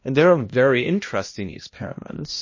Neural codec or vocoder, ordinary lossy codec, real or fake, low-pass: codec, 16 kHz, 1 kbps, X-Codec, HuBERT features, trained on balanced general audio; MP3, 32 kbps; fake; 7.2 kHz